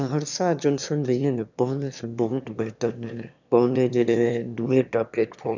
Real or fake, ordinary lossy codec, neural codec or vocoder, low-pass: fake; none; autoencoder, 22.05 kHz, a latent of 192 numbers a frame, VITS, trained on one speaker; 7.2 kHz